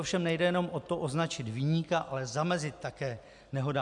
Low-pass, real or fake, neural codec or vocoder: 10.8 kHz; real; none